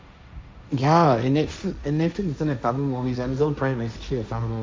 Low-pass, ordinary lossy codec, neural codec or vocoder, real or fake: none; none; codec, 16 kHz, 1.1 kbps, Voila-Tokenizer; fake